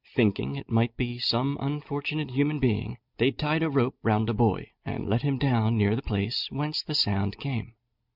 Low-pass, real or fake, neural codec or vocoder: 5.4 kHz; real; none